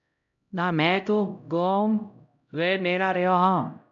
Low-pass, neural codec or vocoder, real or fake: 7.2 kHz; codec, 16 kHz, 0.5 kbps, X-Codec, HuBERT features, trained on LibriSpeech; fake